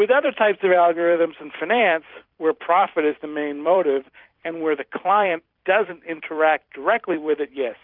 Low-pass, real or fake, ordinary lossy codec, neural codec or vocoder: 5.4 kHz; fake; Opus, 64 kbps; vocoder, 44.1 kHz, 128 mel bands every 256 samples, BigVGAN v2